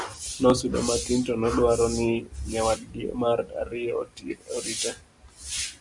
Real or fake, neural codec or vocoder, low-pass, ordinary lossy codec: real; none; 10.8 kHz; Opus, 64 kbps